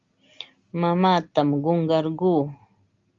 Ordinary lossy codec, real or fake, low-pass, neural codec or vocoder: Opus, 32 kbps; real; 7.2 kHz; none